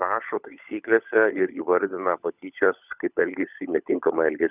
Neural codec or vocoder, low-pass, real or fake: codec, 16 kHz, 16 kbps, FunCodec, trained on LibriTTS, 50 frames a second; 3.6 kHz; fake